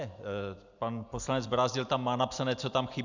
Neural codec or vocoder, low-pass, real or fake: none; 7.2 kHz; real